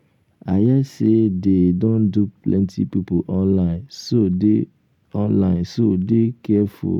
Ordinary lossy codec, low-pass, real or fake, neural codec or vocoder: none; 19.8 kHz; real; none